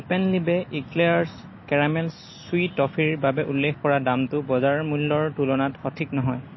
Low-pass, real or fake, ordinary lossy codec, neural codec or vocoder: 7.2 kHz; real; MP3, 24 kbps; none